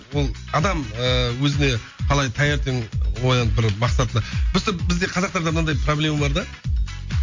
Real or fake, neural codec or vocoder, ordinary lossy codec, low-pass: real; none; MP3, 48 kbps; 7.2 kHz